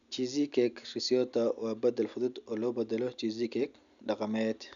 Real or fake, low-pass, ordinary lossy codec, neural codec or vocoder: real; 7.2 kHz; none; none